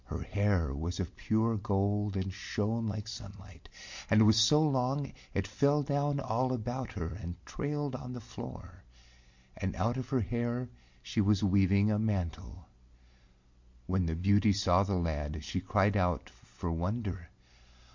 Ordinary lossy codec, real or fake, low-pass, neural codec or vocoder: MP3, 48 kbps; real; 7.2 kHz; none